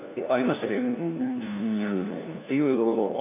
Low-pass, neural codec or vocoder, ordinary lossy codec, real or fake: 3.6 kHz; codec, 16 kHz, 1 kbps, FunCodec, trained on LibriTTS, 50 frames a second; none; fake